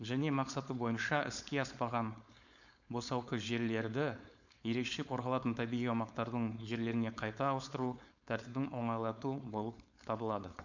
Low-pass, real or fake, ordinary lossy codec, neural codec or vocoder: 7.2 kHz; fake; none; codec, 16 kHz, 4.8 kbps, FACodec